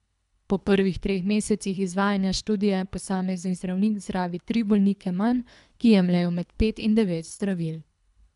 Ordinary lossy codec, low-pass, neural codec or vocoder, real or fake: none; 10.8 kHz; codec, 24 kHz, 3 kbps, HILCodec; fake